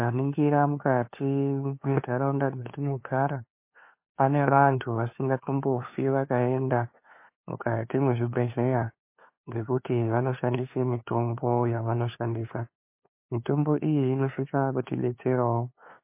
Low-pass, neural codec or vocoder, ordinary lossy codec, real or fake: 3.6 kHz; codec, 16 kHz, 2 kbps, FunCodec, trained on LibriTTS, 25 frames a second; MP3, 24 kbps; fake